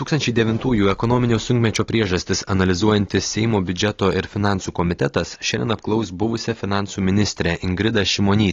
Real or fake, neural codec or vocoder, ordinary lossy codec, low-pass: real; none; AAC, 32 kbps; 7.2 kHz